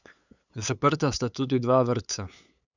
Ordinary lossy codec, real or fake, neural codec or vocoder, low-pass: none; fake; codec, 16 kHz, 8 kbps, FunCodec, trained on LibriTTS, 25 frames a second; 7.2 kHz